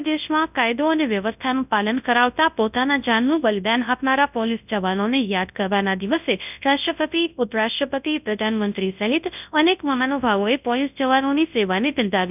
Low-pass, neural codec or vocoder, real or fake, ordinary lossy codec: 3.6 kHz; codec, 24 kHz, 0.9 kbps, WavTokenizer, large speech release; fake; none